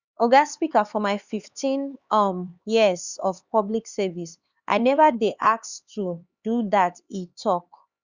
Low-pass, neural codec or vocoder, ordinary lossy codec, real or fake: 7.2 kHz; codec, 16 kHz, 4 kbps, X-Codec, HuBERT features, trained on LibriSpeech; Opus, 64 kbps; fake